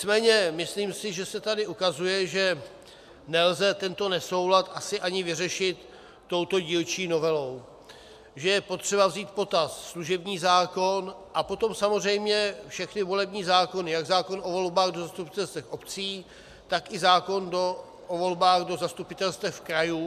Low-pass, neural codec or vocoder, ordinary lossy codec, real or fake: 14.4 kHz; none; MP3, 96 kbps; real